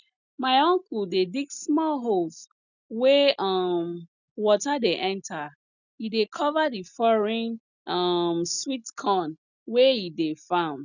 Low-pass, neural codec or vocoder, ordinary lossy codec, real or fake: 7.2 kHz; none; none; real